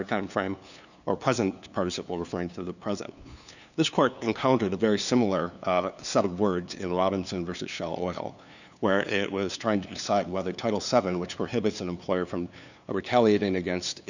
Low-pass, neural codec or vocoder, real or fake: 7.2 kHz; codec, 16 kHz, 2 kbps, FunCodec, trained on LibriTTS, 25 frames a second; fake